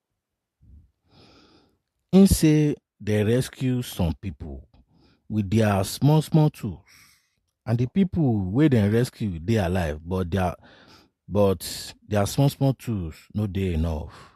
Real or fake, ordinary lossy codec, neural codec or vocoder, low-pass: real; MP3, 64 kbps; none; 14.4 kHz